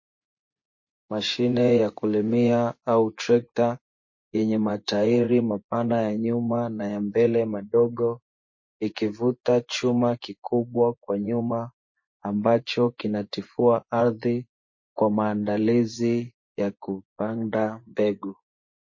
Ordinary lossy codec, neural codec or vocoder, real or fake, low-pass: MP3, 32 kbps; vocoder, 24 kHz, 100 mel bands, Vocos; fake; 7.2 kHz